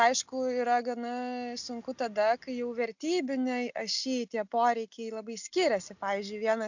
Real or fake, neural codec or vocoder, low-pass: real; none; 7.2 kHz